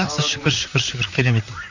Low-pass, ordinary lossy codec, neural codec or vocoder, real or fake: 7.2 kHz; none; vocoder, 44.1 kHz, 128 mel bands, Pupu-Vocoder; fake